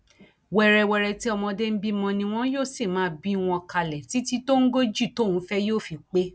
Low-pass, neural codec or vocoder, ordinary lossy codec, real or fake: none; none; none; real